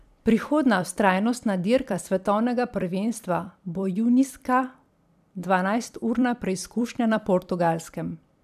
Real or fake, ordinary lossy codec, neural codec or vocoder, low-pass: fake; none; vocoder, 44.1 kHz, 128 mel bands every 256 samples, BigVGAN v2; 14.4 kHz